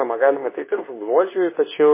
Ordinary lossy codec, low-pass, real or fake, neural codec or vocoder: MP3, 16 kbps; 3.6 kHz; fake; codec, 24 kHz, 0.9 kbps, WavTokenizer, medium speech release version 2